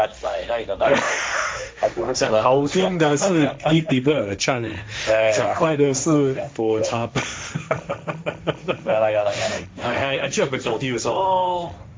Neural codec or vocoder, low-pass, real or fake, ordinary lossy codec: codec, 16 kHz, 1.1 kbps, Voila-Tokenizer; none; fake; none